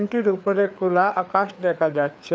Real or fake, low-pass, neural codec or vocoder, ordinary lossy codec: fake; none; codec, 16 kHz, 4 kbps, FreqCodec, larger model; none